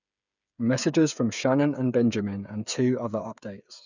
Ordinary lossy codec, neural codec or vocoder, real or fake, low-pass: none; codec, 16 kHz, 8 kbps, FreqCodec, smaller model; fake; 7.2 kHz